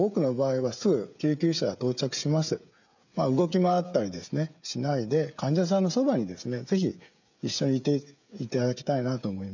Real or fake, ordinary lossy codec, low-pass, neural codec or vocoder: fake; none; 7.2 kHz; codec, 16 kHz, 16 kbps, FreqCodec, smaller model